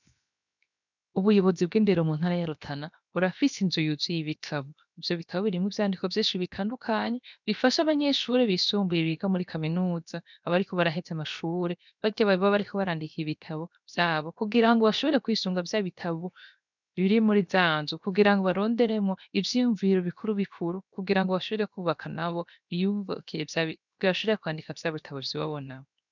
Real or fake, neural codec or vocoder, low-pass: fake; codec, 16 kHz, 0.7 kbps, FocalCodec; 7.2 kHz